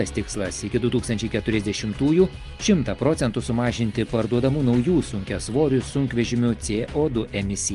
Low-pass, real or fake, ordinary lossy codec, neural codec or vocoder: 10.8 kHz; real; Opus, 32 kbps; none